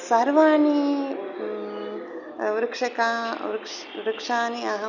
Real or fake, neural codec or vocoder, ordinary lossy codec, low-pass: real; none; none; 7.2 kHz